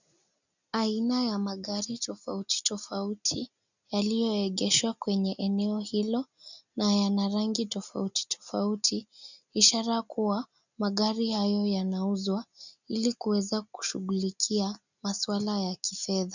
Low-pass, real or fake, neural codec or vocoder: 7.2 kHz; real; none